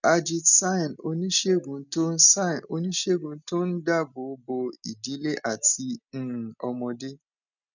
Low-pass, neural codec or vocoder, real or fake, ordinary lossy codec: 7.2 kHz; none; real; none